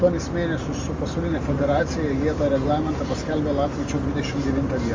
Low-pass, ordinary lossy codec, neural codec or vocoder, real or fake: 7.2 kHz; Opus, 32 kbps; none; real